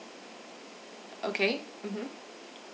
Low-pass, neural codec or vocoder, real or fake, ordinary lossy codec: none; none; real; none